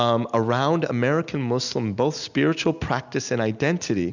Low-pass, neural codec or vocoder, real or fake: 7.2 kHz; none; real